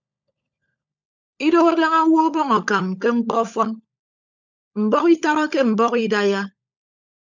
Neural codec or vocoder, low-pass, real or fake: codec, 16 kHz, 16 kbps, FunCodec, trained on LibriTTS, 50 frames a second; 7.2 kHz; fake